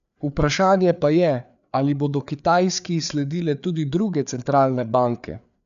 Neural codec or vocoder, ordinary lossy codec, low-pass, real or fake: codec, 16 kHz, 4 kbps, FreqCodec, larger model; none; 7.2 kHz; fake